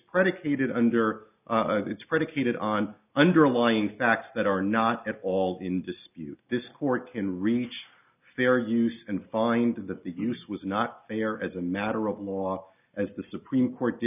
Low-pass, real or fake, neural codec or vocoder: 3.6 kHz; real; none